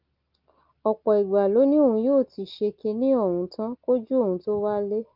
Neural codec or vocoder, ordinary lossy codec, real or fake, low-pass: none; Opus, 32 kbps; real; 5.4 kHz